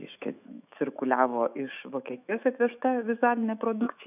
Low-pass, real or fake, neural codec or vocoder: 3.6 kHz; fake; autoencoder, 48 kHz, 128 numbers a frame, DAC-VAE, trained on Japanese speech